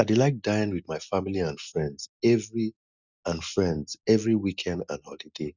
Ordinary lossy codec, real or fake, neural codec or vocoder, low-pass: none; real; none; 7.2 kHz